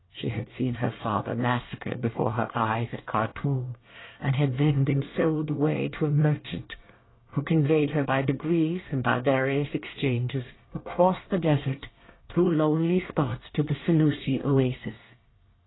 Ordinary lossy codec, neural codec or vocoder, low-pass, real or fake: AAC, 16 kbps; codec, 24 kHz, 1 kbps, SNAC; 7.2 kHz; fake